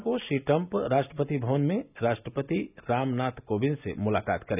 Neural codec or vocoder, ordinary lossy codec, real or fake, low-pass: none; none; real; 3.6 kHz